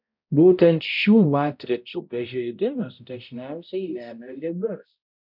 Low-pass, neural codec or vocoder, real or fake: 5.4 kHz; codec, 16 kHz, 0.5 kbps, X-Codec, HuBERT features, trained on balanced general audio; fake